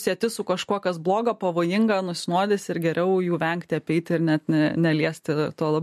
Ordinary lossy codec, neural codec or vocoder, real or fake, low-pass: MP3, 64 kbps; none; real; 14.4 kHz